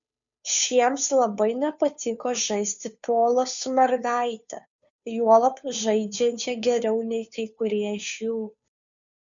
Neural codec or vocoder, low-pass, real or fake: codec, 16 kHz, 2 kbps, FunCodec, trained on Chinese and English, 25 frames a second; 7.2 kHz; fake